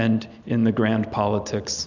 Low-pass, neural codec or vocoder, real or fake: 7.2 kHz; none; real